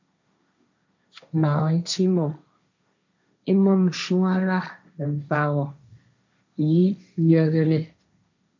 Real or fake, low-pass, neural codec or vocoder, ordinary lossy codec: fake; 7.2 kHz; codec, 16 kHz, 1.1 kbps, Voila-Tokenizer; MP3, 64 kbps